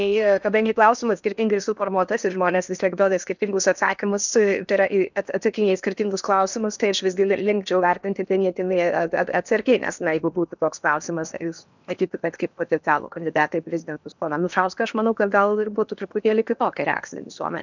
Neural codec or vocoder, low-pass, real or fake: codec, 16 kHz in and 24 kHz out, 0.8 kbps, FocalCodec, streaming, 65536 codes; 7.2 kHz; fake